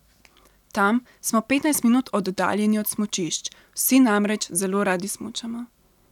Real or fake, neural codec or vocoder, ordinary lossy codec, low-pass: real; none; none; 19.8 kHz